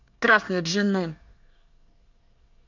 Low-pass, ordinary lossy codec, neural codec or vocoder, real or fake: 7.2 kHz; none; codec, 24 kHz, 1 kbps, SNAC; fake